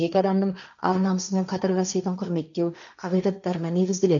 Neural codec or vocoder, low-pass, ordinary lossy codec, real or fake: codec, 16 kHz, 1.1 kbps, Voila-Tokenizer; 7.2 kHz; none; fake